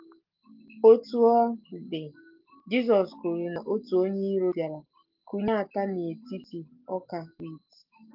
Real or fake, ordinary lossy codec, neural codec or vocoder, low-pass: real; Opus, 32 kbps; none; 5.4 kHz